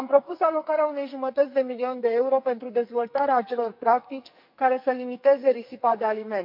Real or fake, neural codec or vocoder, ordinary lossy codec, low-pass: fake; codec, 44.1 kHz, 2.6 kbps, SNAC; MP3, 48 kbps; 5.4 kHz